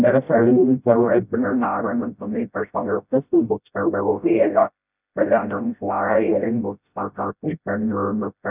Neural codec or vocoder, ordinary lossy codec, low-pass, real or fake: codec, 16 kHz, 0.5 kbps, FreqCodec, smaller model; none; 3.6 kHz; fake